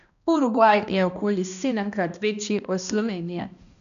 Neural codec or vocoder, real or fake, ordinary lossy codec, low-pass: codec, 16 kHz, 1 kbps, X-Codec, HuBERT features, trained on balanced general audio; fake; none; 7.2 kHz